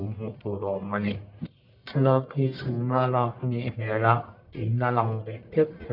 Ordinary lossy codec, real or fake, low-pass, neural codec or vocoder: none; fake; 5.4 kHz; codec, 44.1 kHz, 1.7 kbps, Pupu-Codec